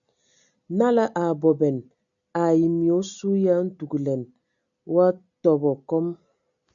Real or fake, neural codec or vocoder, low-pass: real; none; 7.2 kHz